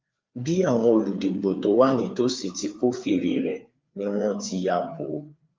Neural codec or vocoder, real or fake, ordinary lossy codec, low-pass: codec, 16 kHz, 4 kbps, FreqCodec, larger model; fake; Opus, 24 kbps; 7.2 kHz